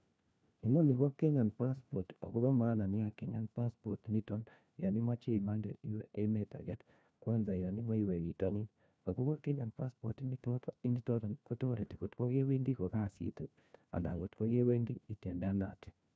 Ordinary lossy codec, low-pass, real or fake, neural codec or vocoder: none; none; fake; codec, 16 kHz, 1 kbps, FunCodec, trained on LibriTTS, 50 frames a second